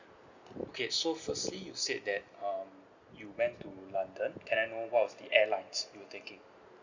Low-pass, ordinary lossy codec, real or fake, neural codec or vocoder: 7.2 kHz; Opus, 64 kbps; real; none